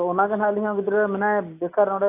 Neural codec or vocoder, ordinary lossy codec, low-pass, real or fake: none; AAC, 24 kbps; 3.6 kHz; real